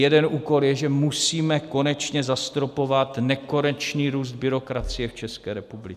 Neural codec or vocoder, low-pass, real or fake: none; 14.4 kHz; real